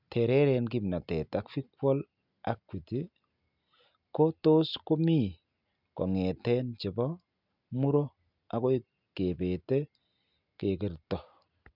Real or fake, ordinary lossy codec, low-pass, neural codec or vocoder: real; none; 5.4 kHz; none